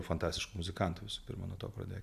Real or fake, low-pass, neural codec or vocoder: real; 14.4 kHz; none